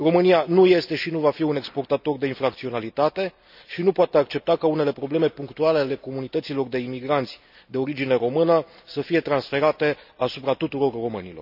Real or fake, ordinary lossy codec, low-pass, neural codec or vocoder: real; none; 5.4 kHz; none